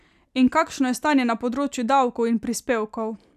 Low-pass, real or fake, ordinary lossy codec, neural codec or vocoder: 14.4 kHz; real; none; none